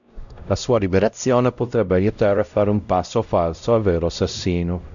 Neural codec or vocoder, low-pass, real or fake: codec, 16 kHz, 0.5 kbps, X-Codec, WavLM features, trained on Multilingual LibriSpeech; 7.2 kHz; fake